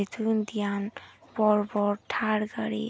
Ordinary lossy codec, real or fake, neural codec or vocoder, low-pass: none; real; none; none